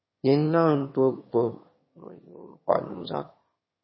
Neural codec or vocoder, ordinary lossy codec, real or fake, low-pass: autoencoder, 22.05 kHz, a latent of 192 numbers a frame, VITS, trained on one speaker; MP3, 24 kbps; fake; 7.2 kHz